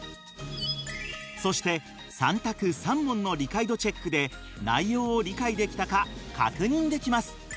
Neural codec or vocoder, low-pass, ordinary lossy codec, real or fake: none; none; none; real